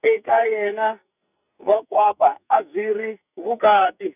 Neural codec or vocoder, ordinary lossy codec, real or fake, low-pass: codec, 44.1 kHz, 2.6 kbps, SNAC; none; fake; 3.6 kHz